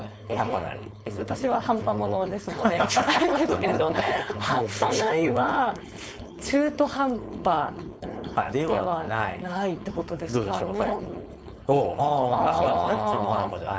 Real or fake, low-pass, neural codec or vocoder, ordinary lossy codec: fake; none; codec, 16 kHz, 4.8 kbps, FACodec; none